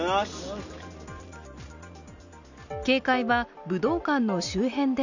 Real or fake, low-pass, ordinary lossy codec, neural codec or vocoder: real; 7.2 kHz; none; none